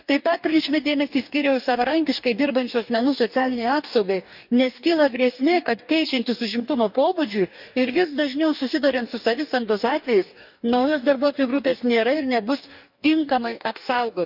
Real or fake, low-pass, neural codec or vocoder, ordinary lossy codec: fake; 5.4 kHz; codec, 44.1 kHz, 2.6 kbps, DAC; none